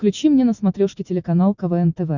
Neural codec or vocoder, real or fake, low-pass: none; real; 7.2 kHz